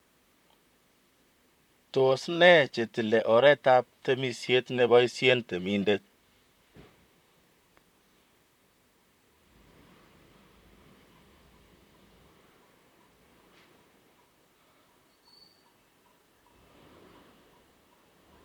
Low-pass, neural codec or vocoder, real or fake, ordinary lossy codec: 19.8 kHz; vocoder, 44.1 kHz, 128 mel bands, Pupu-Vocoder; fake; MP3, 96 kbps